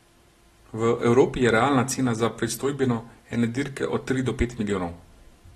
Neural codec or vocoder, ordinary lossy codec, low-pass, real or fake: none; AAC, 32 kbps; 19.8 kHz; real